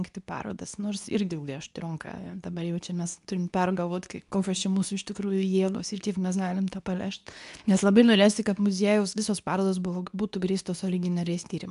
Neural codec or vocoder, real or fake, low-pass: codec, 24 kHz, 0.9 kbps, WavTokenizer, medium speech release version 2; fake; 10.8 kHz